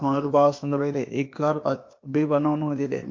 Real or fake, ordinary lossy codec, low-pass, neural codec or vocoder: fake; MP3, 48 kbps; 7.2 kHz; codec, 16 kHz, 0.8 kbps, ZipCodec